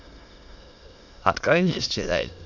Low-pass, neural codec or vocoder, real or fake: 7.2 kHz; autoencoder, 22.05 kHz, a latent of 192 numbers a frame, VITS, trained on many speakers; fake